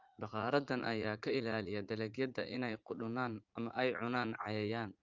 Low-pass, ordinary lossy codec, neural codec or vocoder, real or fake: 7.2 kHz; Opus, 24 kbps; vocoder, 44.1 kHz, 80 mel bands, Vocos; fake